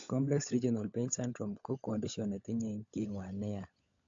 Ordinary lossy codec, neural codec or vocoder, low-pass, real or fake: AAC, 48 kbps; codec, 16 kHz, 16 kbps, FunCodec, trained on LibriTTS, 50 frames a second; 7.2 kHz; fake